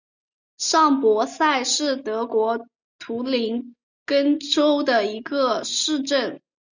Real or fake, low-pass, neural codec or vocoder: real; 7.2 kHz; none